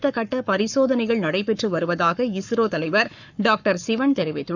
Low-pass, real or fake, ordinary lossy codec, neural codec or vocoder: 7.2 kHz; fake; none; codec, 44.1 kHz, 7.8 kbps, Pupu-Codec